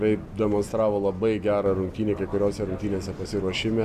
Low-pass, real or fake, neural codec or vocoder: 14.4 kHz; real; none